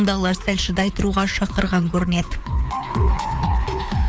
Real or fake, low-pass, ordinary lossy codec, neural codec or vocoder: fake; none; none; codec, 16 kHz, 4 kbps, FreqCodec, larger model